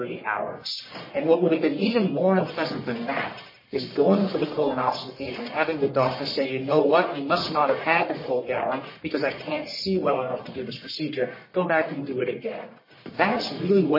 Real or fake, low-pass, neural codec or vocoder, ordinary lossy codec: fake; 5.4 kHz; codec, 44.1 kHz, 1.7 kbps, Pupu-Codec; MP3, 24 kbps